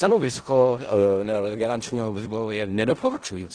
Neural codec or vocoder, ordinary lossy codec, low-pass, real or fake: codec, 16 kHz in and 24 kHz out, 0.4 kbps, LongCat-Audio-Codec, four codebook decoder; Opus, 16 kbps; 9.9 kHz; fake